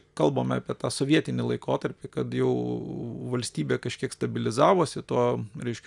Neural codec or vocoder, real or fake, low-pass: none; real; 10.8 kHz